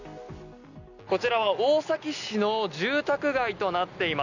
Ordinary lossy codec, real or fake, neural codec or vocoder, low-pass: none; real; none; 7.2 kHz